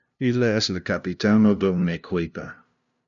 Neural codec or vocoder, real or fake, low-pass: codec, 16 kHz, 0.5 kbps, FunCodec, trained on LibriTTS, 25 frames a second; fake; 7.2 kHz